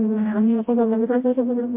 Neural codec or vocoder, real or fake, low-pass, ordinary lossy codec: codec, 16 kHz, 0.5 kbps, FreqCodec, smaller model; fake; 3.6 kHz; MP3, 24 kbps